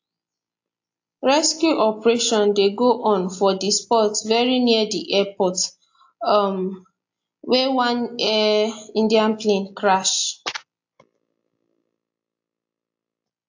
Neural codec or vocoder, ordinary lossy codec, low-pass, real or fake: none; AAC, 48 kbps; 7.2 kHz; real